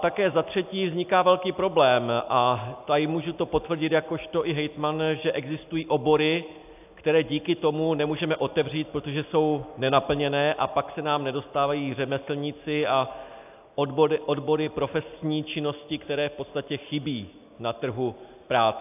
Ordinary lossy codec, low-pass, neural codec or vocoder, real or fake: AAC, 32 kbps; 3.6 kHz; none; real